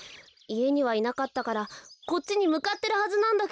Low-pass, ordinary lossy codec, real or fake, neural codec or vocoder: none; none; real; none